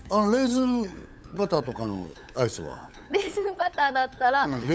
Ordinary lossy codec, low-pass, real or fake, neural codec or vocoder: none; none; fake; codec, 16 kHz, 8 kbps, FunCodec, trained on LibriTTS, 25 frames a second